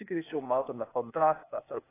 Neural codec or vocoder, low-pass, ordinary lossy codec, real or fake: codec, 16 kHz, 0.8 kbps, ZipCodec; 3.6 kHz; AAC, 16 kbps; fake